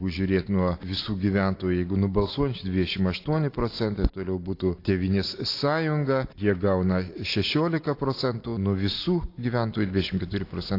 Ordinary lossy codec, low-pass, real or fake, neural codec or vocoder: AAC, 32 kbps; 5.4 kHz; real; none